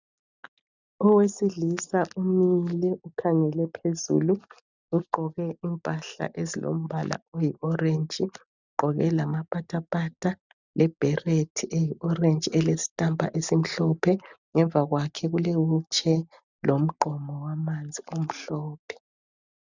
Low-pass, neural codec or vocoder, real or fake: 7.2 kHz; none; real